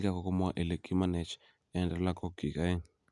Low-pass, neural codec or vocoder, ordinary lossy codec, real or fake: 10.8 kHz; none; none; real